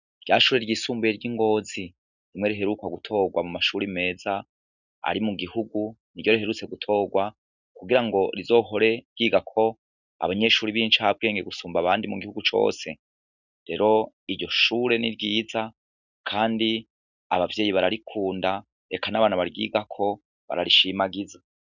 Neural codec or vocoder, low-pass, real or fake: none; 7.2 kHz; real